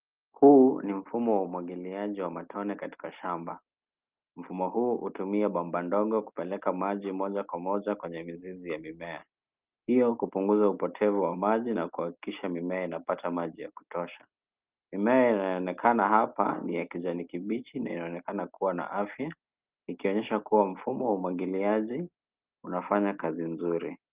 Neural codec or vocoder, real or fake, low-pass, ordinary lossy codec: none; real; 3.6 kHz; Opus, 32 kbps